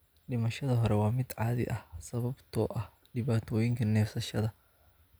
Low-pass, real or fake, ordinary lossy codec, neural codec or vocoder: none; real; none; none